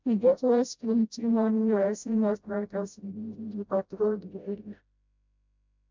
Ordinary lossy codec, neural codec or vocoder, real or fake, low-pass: MP3, 64 kbps; codec, 16 kHz, 0.5 kbps, FreqCodec, smaller model; fake; 7.2 kHz